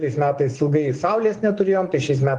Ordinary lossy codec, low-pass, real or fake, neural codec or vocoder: Opus, 24 kbps; 10.8 kHz; fake; vocoder, 24 kHz, 100 mel bands, Vocos